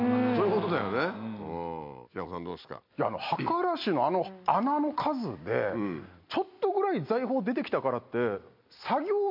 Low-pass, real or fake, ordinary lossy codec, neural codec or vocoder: 5.4 kHz; real; MP3, 48 kbps; none